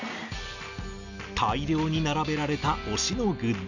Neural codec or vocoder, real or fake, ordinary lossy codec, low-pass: none; real; none; 7.2 kHz